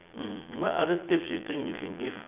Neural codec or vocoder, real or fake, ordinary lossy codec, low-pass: vocoder, 22.05 kHz, 80 mel bands, Vocos; fake; none; 3.6 kHz